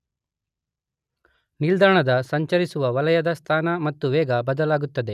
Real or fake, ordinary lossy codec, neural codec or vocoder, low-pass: real; none; none; 14.4 kHz